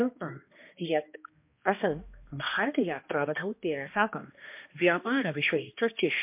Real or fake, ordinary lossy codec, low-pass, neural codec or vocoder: fake; MP3, 32 kbps; 3.6 kHz; codec, 16 kHz, 1 kbps, X-Codec, HuBERT features, trained on balanced general audio